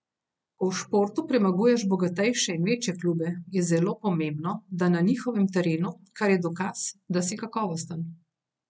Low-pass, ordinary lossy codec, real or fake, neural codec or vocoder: none; none; real; none